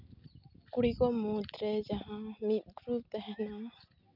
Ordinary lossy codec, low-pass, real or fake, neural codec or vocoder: none; 5.4 kHz; real; none